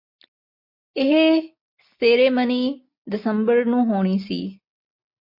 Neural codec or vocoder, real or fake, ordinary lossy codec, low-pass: none; real; MP3, 32 kbps; 5.4 kHz